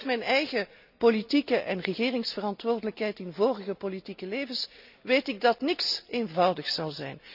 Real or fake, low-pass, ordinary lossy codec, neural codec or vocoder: real; 5.4 kHz; none; none